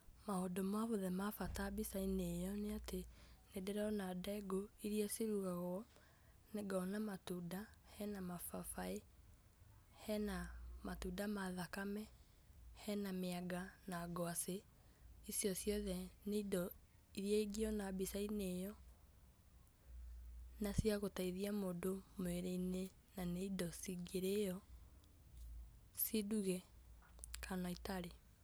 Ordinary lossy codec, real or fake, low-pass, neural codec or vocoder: none; real; none; none